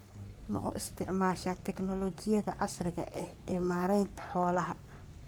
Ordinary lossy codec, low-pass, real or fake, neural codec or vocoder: none; none; fake; codec, 44.1 kHz, 3.4 kbps, Pupu-Codec